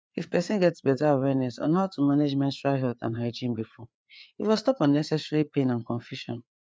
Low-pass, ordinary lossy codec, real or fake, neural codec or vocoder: none; none; fake; codec, 16 kHz, 4 kbps, FreqCodec, larger model